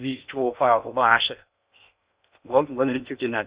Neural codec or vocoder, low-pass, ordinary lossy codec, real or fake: codec, 16 kHz in and 24 kHz out, 0.6 kbps, FocalCodec, streaming, 2048 codes; 3.6 kHz; Opus, 64 kbps; fake